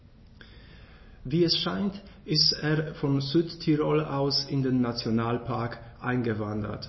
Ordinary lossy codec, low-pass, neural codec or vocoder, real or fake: MP3, 24 kbps; 7.2 kHz; none; real